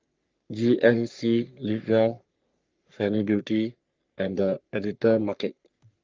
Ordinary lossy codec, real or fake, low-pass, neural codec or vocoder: Opus, 24 kbps; fake; 7.2 kHz; codec, 44.1 kHz, 3.4 kbps, Pupu-Codec